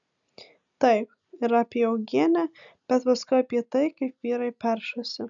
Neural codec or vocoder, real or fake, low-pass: none; real; 7.2 kHz